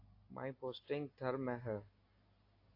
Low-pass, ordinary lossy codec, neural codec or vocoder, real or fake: 5.4 kHz; AAC, 32 kbps; none; real